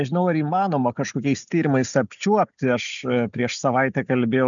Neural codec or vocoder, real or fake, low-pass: none; real; 7.2 kHz